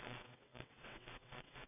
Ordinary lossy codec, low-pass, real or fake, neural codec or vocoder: none; 3.6 kHz; fake; vocoder, 22.05 kHz, 80 mel bands, WaveNeXt